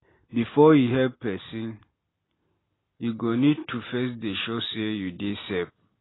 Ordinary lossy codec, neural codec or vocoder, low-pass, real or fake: AAC, 16 kbps; none; 7.2 kHz; real